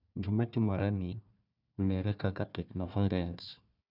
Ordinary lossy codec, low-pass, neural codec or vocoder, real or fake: none; 5.4 kHz; codec, 16 kHz, 1 kbps, FunCodec, trained on Chinese and English, 50 frames a second; fake